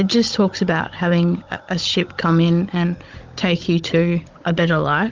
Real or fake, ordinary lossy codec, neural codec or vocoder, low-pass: fake; Opus, 32 kbps; codec, 16 kHz, 16 kbps, FunCodec, trained on Chinese and English, 50 frames a second; 7.2 kHz